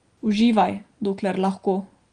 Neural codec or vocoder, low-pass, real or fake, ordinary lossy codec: none; 9.9 kHz; real; Opus, 32 kbps